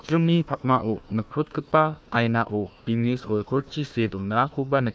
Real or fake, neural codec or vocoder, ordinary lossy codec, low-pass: fake; codec, 16 kHz, 1 kbps, FunCodec, trained on Chinese and English, 50 frames a second; none; none